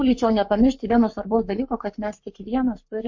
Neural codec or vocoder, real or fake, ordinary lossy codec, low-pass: codec, 44.1 kHz, 7.8 kbps, Pupu-Codec; fake; MP3, 48 kbps; 7.2 kHz